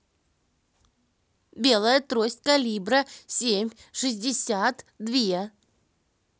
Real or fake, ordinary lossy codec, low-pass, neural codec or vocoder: real; none; none; none